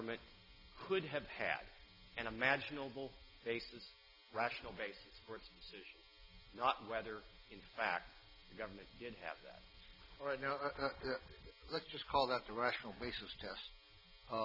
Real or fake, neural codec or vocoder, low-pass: real; none; 5.4 kHz